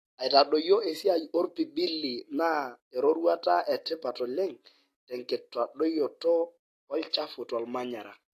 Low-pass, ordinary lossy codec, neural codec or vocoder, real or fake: 14.4 kHz; AAC, 48 kbps; none; real